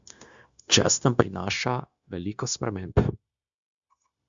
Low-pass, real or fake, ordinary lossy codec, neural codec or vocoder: 7.2 kHz; fake; Opus, 64 kbps; codec, 16 kHz, 0.9 kbps, LongCat-Audio-Codec